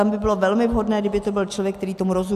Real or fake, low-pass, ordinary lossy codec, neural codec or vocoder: real; 14.4 kHz; AAC, 96 kbps; none